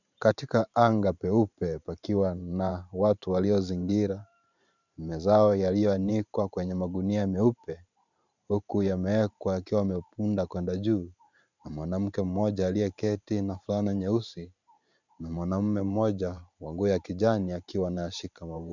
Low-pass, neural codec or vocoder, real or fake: 7.2 kHz; vocoder, 44.1 kHz, 128 mel bands every 512 samples, BigVGAN v2; fake